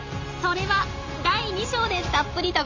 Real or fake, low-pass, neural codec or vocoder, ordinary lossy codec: real; 7.2 kHz; none; MP3, 48 kbps